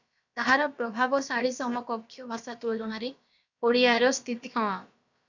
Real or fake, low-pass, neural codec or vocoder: fake; 7.2 kHz; codec, 16 kHz, about 1 kbps, DyCAST, with the encoder's durations